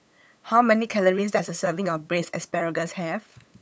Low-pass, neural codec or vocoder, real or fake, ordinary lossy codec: none; codec, 16 kHz, 8 kbps, FunCodec, trained on LibriTTS, 25 frames a second; fake; none